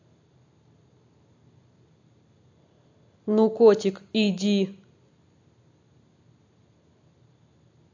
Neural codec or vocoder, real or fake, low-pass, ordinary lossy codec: none; real; 7.2 kHz; AAC, 48 kbps